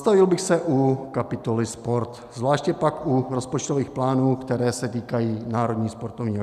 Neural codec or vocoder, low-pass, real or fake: none; 14.4 kHz; real